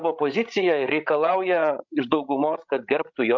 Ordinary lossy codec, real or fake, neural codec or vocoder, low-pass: MP3, 64 kbps; fake; codec, 16 kHz, 16 kbps, FreqCodec, larger model; 7.2 kHz